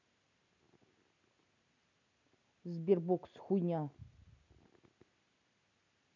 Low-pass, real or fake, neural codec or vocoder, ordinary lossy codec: 7.2 kHz; real; none; none